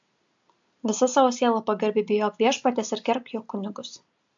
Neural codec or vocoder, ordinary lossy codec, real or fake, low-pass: none; AAC, 64 kbps; real; 7.2 kHz